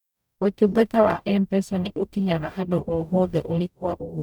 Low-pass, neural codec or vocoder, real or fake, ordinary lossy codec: 19.8 kHz; codec, 44.1 kHz, 0.9 kbps, DAC; fake; none